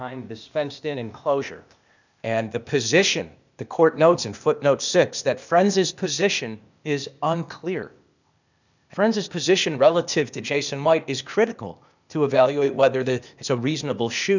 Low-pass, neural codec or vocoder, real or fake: 7.2 kHz; codec, 16 kHz, 0.8 kbps, ZipCodec; fake